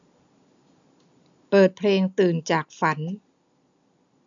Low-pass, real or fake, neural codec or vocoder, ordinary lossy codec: 7.2 kHz; real; none; none